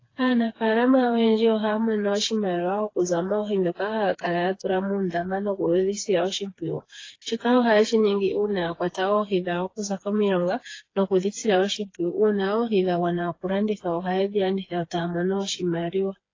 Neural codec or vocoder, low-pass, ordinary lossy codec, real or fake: codec, 16 kHz, 4 kbps, FreqCodec, smaller model; 7.2 kHz; AAC, 32 kbps; fake